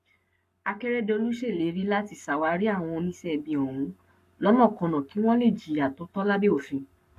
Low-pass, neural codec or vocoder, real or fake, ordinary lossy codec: 14.4 kHz; codec, 44.1 kHz, 7.8 kbps, Pupu-Codec; fake; none